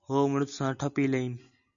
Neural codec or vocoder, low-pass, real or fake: none; 7.2 kHz; real